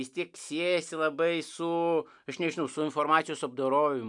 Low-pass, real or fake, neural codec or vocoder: 10.8 kHz; real; none